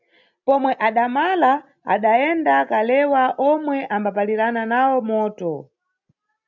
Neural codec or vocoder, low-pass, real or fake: none; 7.2 kHz; real